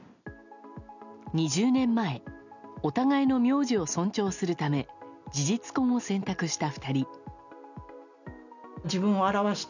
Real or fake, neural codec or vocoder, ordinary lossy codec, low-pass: real; none; none; 7.2 kHz